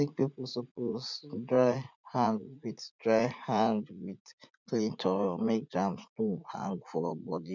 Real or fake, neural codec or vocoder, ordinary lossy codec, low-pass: fake; vocoder, 44.1 kHz, 80 mel bands, Vocos; none; 7.2 kHz